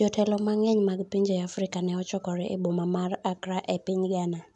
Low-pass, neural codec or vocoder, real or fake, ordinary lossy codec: 10.8 kHz; none; real; none